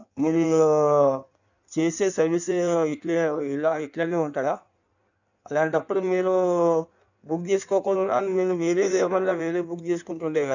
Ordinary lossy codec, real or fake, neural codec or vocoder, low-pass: none; fake; codec, 16 kHz in and 24 kHz out, 1.1 kbps, FireRedTTS-2 codec; 7.2 kHz